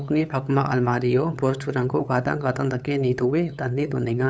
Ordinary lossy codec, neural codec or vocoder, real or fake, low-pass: none; codec, 16 kHz, 8 kbps, FunCodec, trained on LibriTTS, 25 frames a second; fake; none